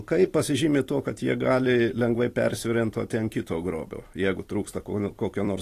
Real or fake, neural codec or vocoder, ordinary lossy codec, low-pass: fake; vocoder, 44.1 kHz, 128 mel bands every 256 samples, BigVGAN v2; AAC, 48 kbps; 14.4 kHz